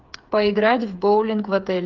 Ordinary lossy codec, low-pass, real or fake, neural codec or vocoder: Opus, 24 kbps; 7.2 kHz; fake; codec, 16 kHz, 8 kbps, FreqCodec, smaller model